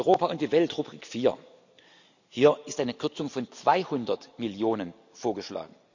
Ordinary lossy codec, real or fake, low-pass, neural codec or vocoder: none; real; 7.2 kHz; none